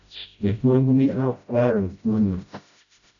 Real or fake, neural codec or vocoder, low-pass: fake; codec, 16 kHz, 0.5 kbps, FreqCodec, smaller model; 7.2 kHz